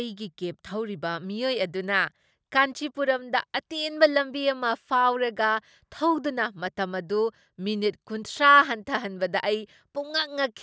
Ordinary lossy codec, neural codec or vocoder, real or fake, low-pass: none; none; real; none